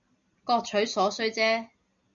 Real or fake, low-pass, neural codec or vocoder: real; 7.2 kHz; none